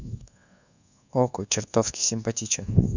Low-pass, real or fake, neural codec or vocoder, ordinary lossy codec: 7.2 kHz; fake; codec, 24 kHz, 1.2 kbps, DualCodec; none